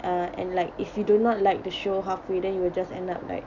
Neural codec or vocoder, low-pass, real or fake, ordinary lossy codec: none; 7.2 kHz; real; none